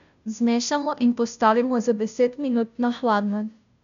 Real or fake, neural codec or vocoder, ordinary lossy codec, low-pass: fake; codec, 16 kHz, 0.5 kbps, FunCodec, trained on Chinese and English, 25 frames a second; none; 7.2 kHz